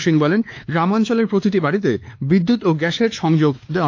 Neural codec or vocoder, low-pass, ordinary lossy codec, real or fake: codec, 16 kHz, 2 kbps, X-Codec, WavLM features, trained on Multilingual LibriSpeech; 7.2 kHz; AAC, 48 kbps; fake